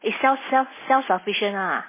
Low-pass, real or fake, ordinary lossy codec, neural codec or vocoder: 3.6 kHz; real; MP3, 16 kbps; none